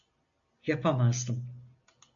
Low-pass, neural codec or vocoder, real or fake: 7.2 kHz; none; real